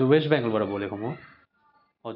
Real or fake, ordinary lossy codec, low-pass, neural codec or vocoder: real; none; 5.4 kHz; none